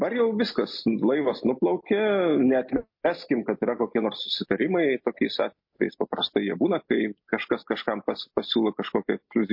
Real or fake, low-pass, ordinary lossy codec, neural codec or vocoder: real; 5.4 kHz; MP3, 32 kbps; none